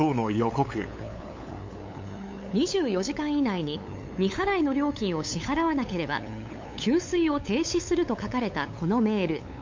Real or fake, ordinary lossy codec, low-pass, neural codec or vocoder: fake; MP3, 48 kbps; 7.2 kHz; codec, 16 kHz, 8 kbps, FunCodec, trained on LibriTTS, 25 frames a second